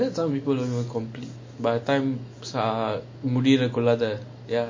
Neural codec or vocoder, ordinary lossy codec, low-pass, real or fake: none; MP3, 32 kbps; 7.2 kHz; real